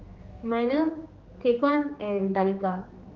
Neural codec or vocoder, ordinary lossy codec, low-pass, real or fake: codec, 16 kHz, 2 kbps, X-Codec, HuBERT features, trained on general audio; Opus, 32 kbps; 7.2 kHz; fake